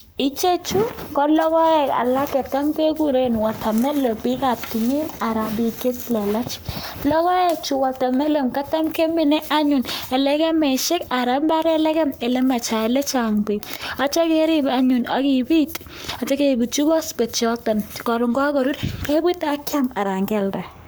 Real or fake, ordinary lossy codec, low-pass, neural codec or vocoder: fake; none; none; codec, 44.1 kHz, 7.8 kbps, Pupu-Codec